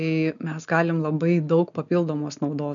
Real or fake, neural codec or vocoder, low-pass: real; none; 7.2 kHz